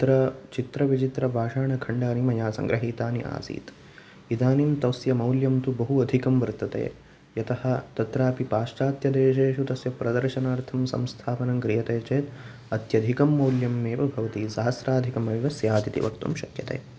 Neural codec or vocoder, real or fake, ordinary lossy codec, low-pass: none; real; none; none